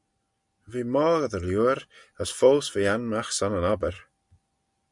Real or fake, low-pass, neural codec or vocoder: real; 10.8 kHz; none